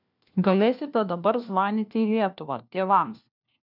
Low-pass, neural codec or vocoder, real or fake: 5.4 kHz; codec, 16 kHz, 1 kbps, FunCodec, trained on LibriTTS, 50 frames a second; fake